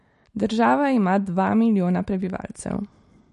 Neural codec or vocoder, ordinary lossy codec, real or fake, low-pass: none; MP3, 48 kbps; real; 14.4 kHz